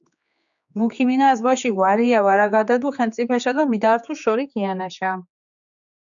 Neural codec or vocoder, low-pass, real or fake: codec, 16 kHz, 4 kbps, X-Codec, HuBERT features, trained on general audio; 7.2 kHz; fake